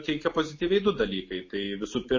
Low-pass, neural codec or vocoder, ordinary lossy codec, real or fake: 7.2 kHz; none; MP3, 32 kbps; real